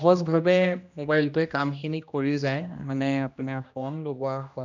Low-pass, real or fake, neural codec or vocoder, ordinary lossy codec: 7.2 kHz; fake; codec, 16 kHz, 1 kbps, X-Codec, HuBERT features, trained on general audio; none